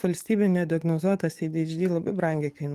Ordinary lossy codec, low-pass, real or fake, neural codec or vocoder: Opus, 24 kbps; 14.4 kHz; fake; codec, 44.1 kHz, 7.8 kbps, DAC